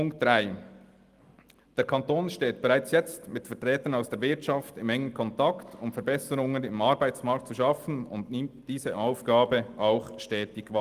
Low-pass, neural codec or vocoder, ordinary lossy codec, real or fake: 14.4 kHz; none; Opus, 24 kbps; real